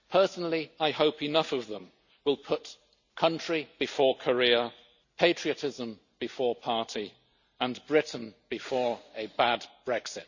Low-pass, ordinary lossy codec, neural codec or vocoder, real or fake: 7.2 kHz; none; none; real